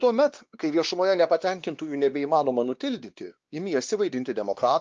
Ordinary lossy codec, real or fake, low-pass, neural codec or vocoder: Opus, 24 kbps; fake; 7.2 kHz; codec, 16 kHz, 2 kbps, X-Codec, WavLM features, trained on Multilingual LibriSpeech